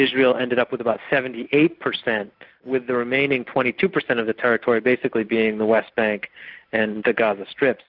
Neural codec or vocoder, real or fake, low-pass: none; real; 5.4 kHz